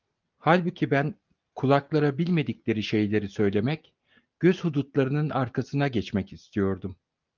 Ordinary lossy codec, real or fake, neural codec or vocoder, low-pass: Opus, 24 kbps; real; none; 7.2 kHz